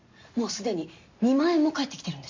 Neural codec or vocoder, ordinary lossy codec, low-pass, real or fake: none; MP3, 48 kbps; 7.2 kHz; real